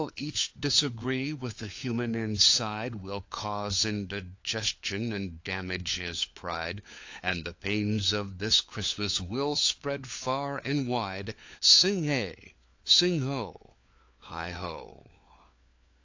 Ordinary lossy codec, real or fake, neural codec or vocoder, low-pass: AAC, 48 kbps; fake; codec, 16 kHz, 4 kbps, FunCodec, trained on LibriTTS, 50 frames a second; 7.2 kHz